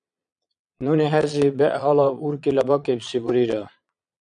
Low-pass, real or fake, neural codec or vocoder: 9.9 kHz; fake; vocoder, 22.05 kHz, 80 mel bands, Vocos